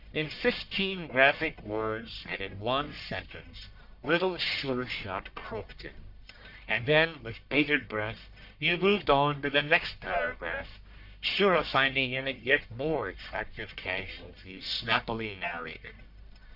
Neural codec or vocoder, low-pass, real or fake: codec, 44.1 kHz, 1.7 kbps, Pupu-Codec; 5.4 kHz; fake